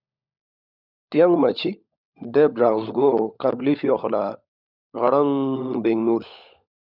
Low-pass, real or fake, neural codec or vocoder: 5.4 kHz; fake; codec, 16 kHz, 16 kbps, FunCodec, trained on LibriTTS, 50 frames a second